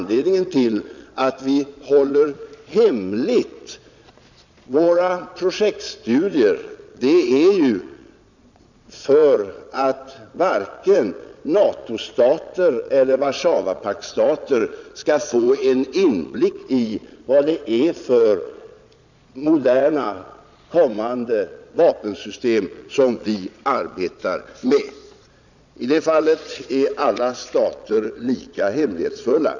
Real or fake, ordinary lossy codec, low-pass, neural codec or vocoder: fake; none; 7.2 kHz; vocoder, 22.05 kHz, 80 mel bands, Vocos